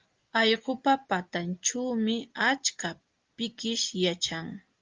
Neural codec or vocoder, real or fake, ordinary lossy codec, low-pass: none; real; Opus, 32 kbps; 7.2 kHz